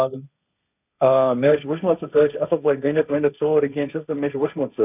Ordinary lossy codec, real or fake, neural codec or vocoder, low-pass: none; fake; codec, 16 kHz, 1.1 kbps, Voila-Tokenizer; 3.6 kHz